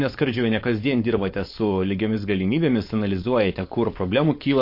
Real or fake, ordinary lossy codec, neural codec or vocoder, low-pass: fake; MP3, 32 kbps; codec, 16 kHz, 4.8 kbps, FACodec; 5.4 kHz